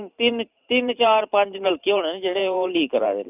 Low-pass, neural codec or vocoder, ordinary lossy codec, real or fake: 3.6 kHz; vocoder, 44.1 kHz, 128 mel bands every 512 samples, BigVGAN v2; none; fake